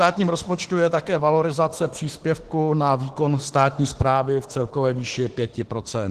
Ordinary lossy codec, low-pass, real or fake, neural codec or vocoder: Opus, 16 kbps; 14.4 kHz; fake; autoencoder, 48 kHz, 32 numbers a frame, DAC-VAE, trained on Japanese speech